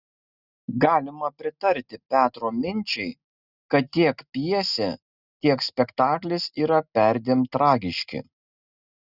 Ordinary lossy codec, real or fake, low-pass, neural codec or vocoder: Opus, 64 kbps; real; 5.4 kHz; none